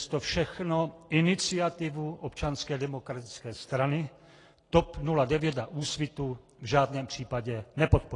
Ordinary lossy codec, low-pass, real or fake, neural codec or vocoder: AAC, 32 kbps; 10.8 kHz; real; none